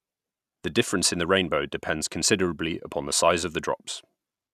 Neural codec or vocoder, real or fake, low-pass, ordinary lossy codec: none; real; 14.4 kHz; none